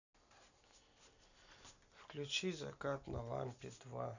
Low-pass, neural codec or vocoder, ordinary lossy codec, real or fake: 7.2 kHz; none; none; real